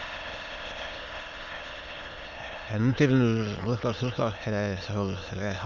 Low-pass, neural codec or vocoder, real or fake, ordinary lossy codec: 7.2 kHz; autoencoder, 22.05 kHz, a latent of 192 numbers a frame, VITS, trained on many speakers; fake; Opus, 64 kbps